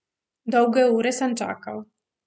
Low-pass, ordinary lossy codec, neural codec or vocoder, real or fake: none; none; none; real